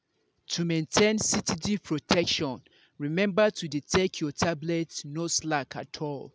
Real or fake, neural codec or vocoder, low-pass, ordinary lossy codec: real; none; none; none